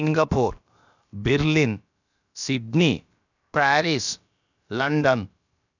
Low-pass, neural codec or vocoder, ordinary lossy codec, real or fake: 7.2 kHz; codec, 16 kHz, about 1 kbps, DyCAST, with the encoder's durations; none; fake